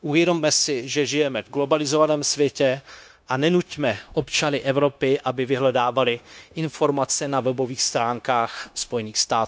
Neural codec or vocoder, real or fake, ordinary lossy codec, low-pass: codec, 16 kHz, 0.9 kbps, LongCat-Audio-Codec; fake; none; none